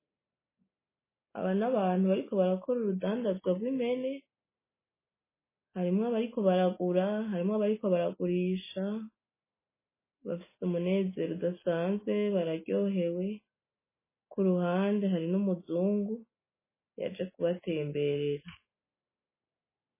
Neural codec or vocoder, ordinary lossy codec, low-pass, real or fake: none; MP3, 16 kbps; 3.6 kHz; real